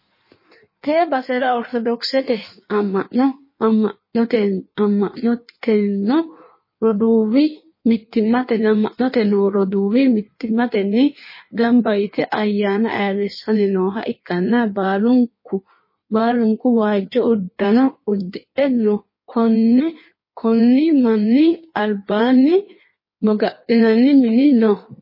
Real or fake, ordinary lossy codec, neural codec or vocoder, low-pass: fake; MP3, 24 kbps; codec, 16 kHz in and 24 kHz out, 1.1 kbps, FireRedTTS-2 codec; 5.4 kHz